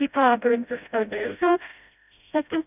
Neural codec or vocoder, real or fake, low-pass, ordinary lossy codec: codec, 16 kHz, 0.5 kbps, FreqCodec, smaller model; fake; 3.6 kHz; none